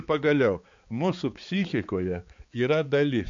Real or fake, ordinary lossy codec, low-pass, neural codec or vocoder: fake; MP3, 48 kbps; 7.2 kHz; codec, 16 kHz, 4 kbps, X-Codec, HuBERT features, trained on balanced general audio